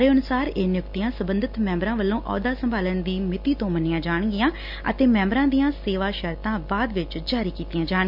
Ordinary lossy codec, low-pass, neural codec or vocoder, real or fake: none; 5.4 kHz; none; real